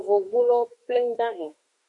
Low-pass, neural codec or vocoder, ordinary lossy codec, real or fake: 10.8 kHz; autoencoder, 48 kHz, 32 numbers a frame, DAC-VAE, trained on Japanese speech; MP3, 48 kbps; fake